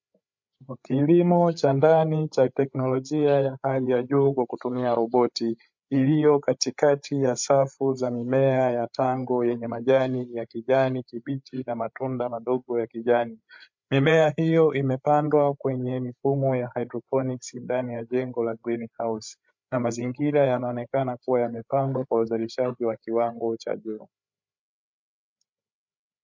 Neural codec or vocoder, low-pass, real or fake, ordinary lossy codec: codec, 16 kHz, 8 kbps, FreqCodec, larger model; 7.2 kHz; fake; MP3, 48 kbps